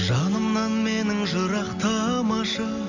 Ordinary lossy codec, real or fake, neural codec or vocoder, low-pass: none; real; none; 7.2 kHz